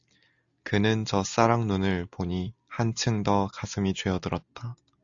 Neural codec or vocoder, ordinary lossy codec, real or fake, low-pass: none; AAC, 64 kbps; real; 7.2 kHz